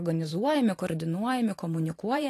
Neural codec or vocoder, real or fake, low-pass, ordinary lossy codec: vocoder, 44.1 kHz, 128 mel bands every 512 samples, BigVGAN v2; fake; 14.4 kHz; AAC, 48 kbps